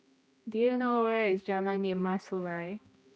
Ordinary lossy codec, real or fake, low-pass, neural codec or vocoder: none; fake; none; codec, 16 kHz, 1 kbps, X-Codec, HuBERT features, trained on general audio